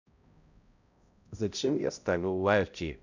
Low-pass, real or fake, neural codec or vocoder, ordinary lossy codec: 7.2 kHz; fake; codec, 16 kHz, 0.5 kbps, X-Codec, HuBERT features, trained on balanced general audio; none